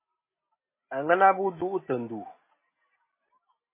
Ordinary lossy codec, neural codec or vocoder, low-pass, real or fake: MP3, 16 kbps; none; 3.6 kHz; real